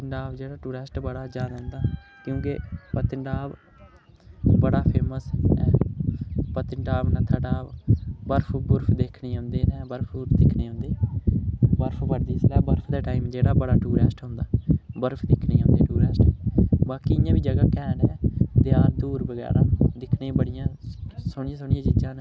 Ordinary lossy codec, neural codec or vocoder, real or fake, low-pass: none; none; real; none